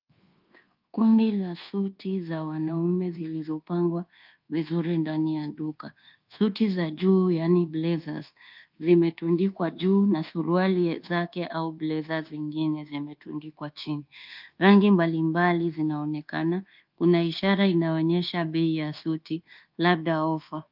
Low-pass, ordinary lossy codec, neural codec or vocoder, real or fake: 5.4 kHz; Opus, 32 kbps; codec, 24 kHz, 1.2 kbps, DualCodec; fake